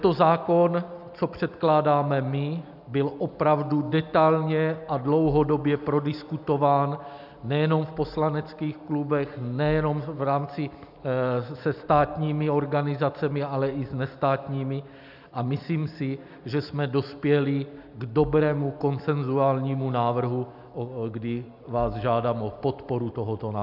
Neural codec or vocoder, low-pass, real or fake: none; 5.4 kHz; real